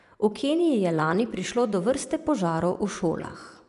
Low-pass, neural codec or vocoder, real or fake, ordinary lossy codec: 10.8 kHz; vocoder, 24 kHz, 100 mel bands, Vocos; fake; none